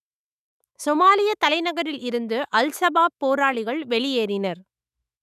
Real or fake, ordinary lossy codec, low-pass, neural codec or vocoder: fake; none; 14.4 kHz; autoencoder, 48 kHz, 128 numbers a frame, DAC-VAE, trained on Japanese speech